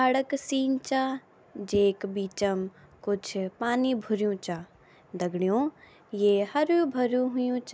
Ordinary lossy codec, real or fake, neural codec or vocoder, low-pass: none; real; none; none